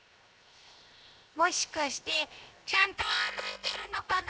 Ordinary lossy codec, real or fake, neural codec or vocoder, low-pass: none; fake; codec, 16 kHz, 0.7 kbps, FocalCodec; none